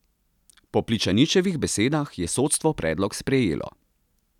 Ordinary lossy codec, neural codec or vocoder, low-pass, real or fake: none; none; 19.8 kHz; real